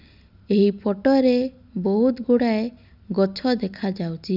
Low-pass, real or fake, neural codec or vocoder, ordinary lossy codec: 5.4 kHz; real; none; none